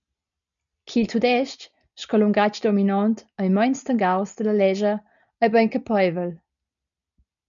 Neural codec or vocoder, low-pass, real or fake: none; 7.2 kHz; real